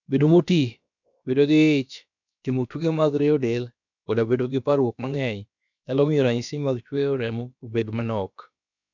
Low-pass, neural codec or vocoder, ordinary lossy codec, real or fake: 7.2 kHz; codec, 16 kHz, about 1 kbps, DyCAST, with the encoder's durations; none; fake